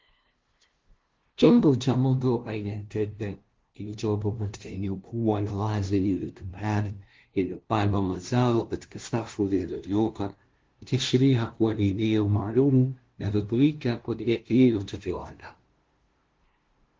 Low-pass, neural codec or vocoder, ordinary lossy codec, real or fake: 7.2 kHz; codec, 16 kHz, 0.5 kbps, FunCodec, trained on LibriTTS, 25 frames a second; Opus, 16 kbps; fake